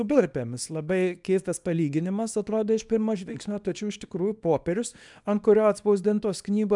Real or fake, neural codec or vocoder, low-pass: fake; codec, 24 kHz, 0.9 kbps, WavTokenizer, small release; 10.8 kHz